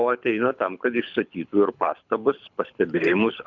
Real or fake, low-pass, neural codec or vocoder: fake; 7.2 kHz; codec, 24 kHz, 6 kbps, HILCodec